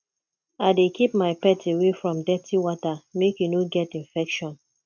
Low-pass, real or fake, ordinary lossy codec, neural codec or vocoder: 7.2 kHz; real; MP3, 64 kbps; none